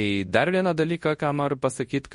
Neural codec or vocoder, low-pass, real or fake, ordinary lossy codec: codec, 24 kHz, 0.9 kbps, WavTokenizer, large speech release; 10.8 kHz; fake; MP3, 48 kbps